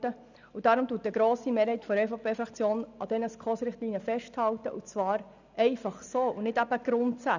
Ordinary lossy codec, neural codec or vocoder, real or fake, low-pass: none; none; real; 7.2 kHz